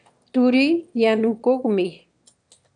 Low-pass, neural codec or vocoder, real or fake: 9.9 kHz; autoencoder, 22.05 kHz, a latent of 192 numbers a frame, VITS, trained on one speaker; fake